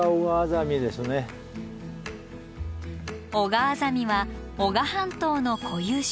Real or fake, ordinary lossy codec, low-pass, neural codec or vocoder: real; none; none; none